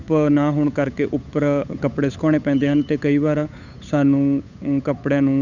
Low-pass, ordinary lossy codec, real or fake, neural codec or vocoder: 7.2 kHz; none; fake; codec, 16 kHz, 8 kbps, FunCodec, trained on Chinese and English, 25 frames a second